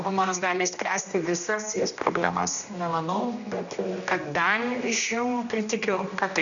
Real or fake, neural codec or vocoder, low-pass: fake; codec, 16 kHz, 1 kbps, X-Codec, HuBERT features, trained on general audio; 7.2 kHz